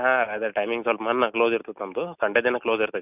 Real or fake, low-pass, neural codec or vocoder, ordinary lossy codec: real; 3.6 kHz; none; none